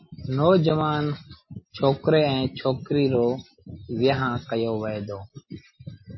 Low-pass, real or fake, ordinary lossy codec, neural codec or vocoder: 7.2 kHz; real; MP3, 24 kbps; none